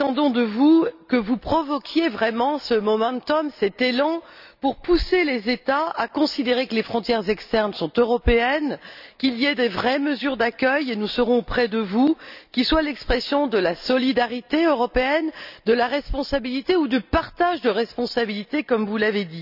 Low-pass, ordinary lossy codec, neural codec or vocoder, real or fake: 5.4 kHz; none; none; real